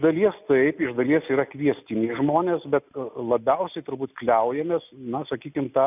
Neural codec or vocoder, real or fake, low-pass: none; real; 3.6 kHz